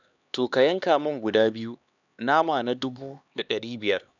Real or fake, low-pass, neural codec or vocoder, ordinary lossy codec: fake; 7.2 kHz; codec, 16 kHz, 2 kbps, X-Codec, HuBERT features, trained on LibriSpeech; none